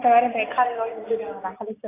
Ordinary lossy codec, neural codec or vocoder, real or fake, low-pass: AAC, 24 kbps; none; real; 3.6 kHz